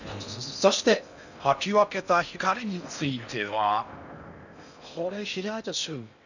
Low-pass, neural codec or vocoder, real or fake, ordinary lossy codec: 7.2 kHz; codec, 16 kHz in and 24 kHz out, 0.6 kbps, FocalCodec, streaming, 4096 codes; fake; none